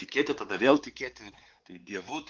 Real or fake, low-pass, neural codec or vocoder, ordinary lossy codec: fake; 7.2 kHz; codec, 16 kHz, 4 kbps, X-Codec, WavLM features, trained on Multilingual LibriSpeech; Opus, 16 kbps